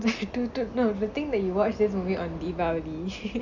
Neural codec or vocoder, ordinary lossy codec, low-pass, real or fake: none; none; 7.2 kHz; real